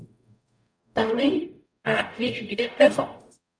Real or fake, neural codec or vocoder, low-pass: fake; codec, 44.1 kHz, 0.9 kbps, DAC; 9.9 kHz